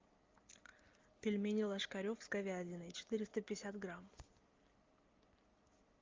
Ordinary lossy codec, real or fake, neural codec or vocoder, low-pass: Opus, 24 kbps; real; none; 7.2 kHz